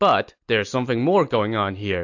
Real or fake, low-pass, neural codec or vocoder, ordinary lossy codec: real; 7.2 kHz; none; AAC, 48 kbps